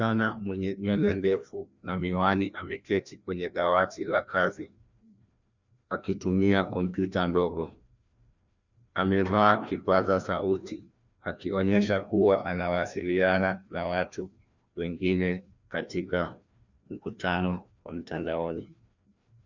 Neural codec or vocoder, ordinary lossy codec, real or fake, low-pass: codec, 16 kHz, 1 kbps, FreqCodec, larger model; Opus, 64 kbps; fake; 7.2 kHz